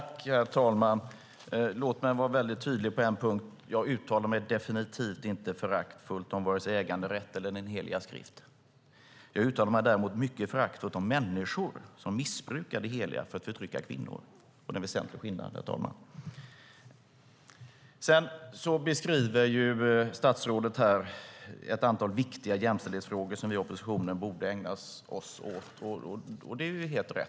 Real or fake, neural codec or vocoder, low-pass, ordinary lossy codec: real; none; none; none